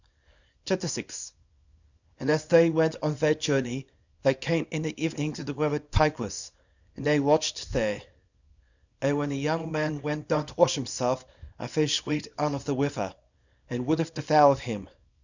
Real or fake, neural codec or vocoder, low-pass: fake; codec, 24 kHz, 0.9 kbps, WavTokenizer, small release; 7.2 kHz